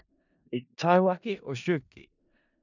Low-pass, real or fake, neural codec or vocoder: 7.2 kHz; fake; codec, 16 kHz in and 24 kHz out, 0.4 kbps, LongCat-Audio-Codec, four codebook decoder